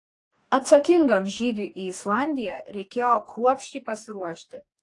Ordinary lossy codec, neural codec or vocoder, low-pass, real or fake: AAC, 48 kbps; codec, 44.1 kHz, 2.6 kbps, DAC; 10.8 kHz; fake